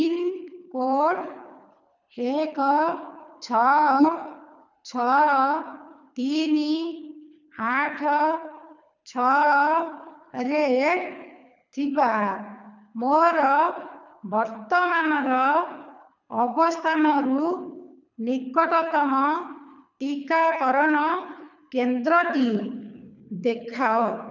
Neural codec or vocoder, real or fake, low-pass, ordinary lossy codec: codec, 24 kHz, 3 kbps, HILCodec; fake; 7.2 kHz; none